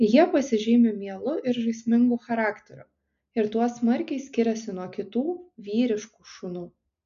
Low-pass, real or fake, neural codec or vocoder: 7.2 kHz; real; none